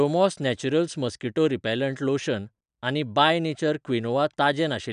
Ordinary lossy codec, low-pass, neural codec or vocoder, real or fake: none; 9.9 kHz; none; real